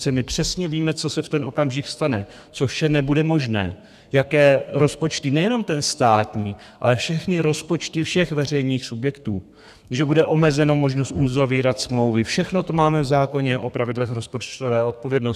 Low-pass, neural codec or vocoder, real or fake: 14.4 kHz; codec, 32 kHz, 1.9 kbps, SNAC; fake